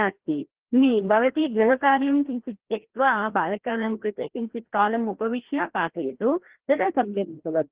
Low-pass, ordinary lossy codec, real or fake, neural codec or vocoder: 3.6 kHz; Opus, 16 kbps; fake; codec, 16 kHz, 1 kbps, FreqCodec, larger model